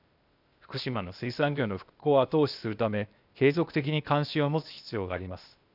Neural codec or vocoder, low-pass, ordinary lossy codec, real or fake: codec, 16 kHz, 0.8 kbps, ZipCodec; 5.4 kHz; none; fake